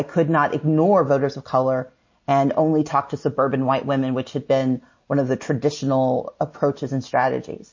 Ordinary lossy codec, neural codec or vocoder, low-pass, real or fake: MP3, 32 kbps; none; 7.2 kHz; real